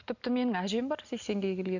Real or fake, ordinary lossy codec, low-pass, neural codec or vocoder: real; none; 7.2 kHz; none